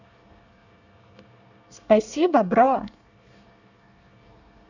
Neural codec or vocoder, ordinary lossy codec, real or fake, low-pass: codec, 24 kHz, 1 kbps, SNAC; none; fake; 7.2 kHz